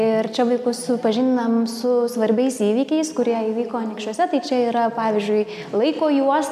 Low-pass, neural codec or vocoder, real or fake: 14.4 kHz; none; real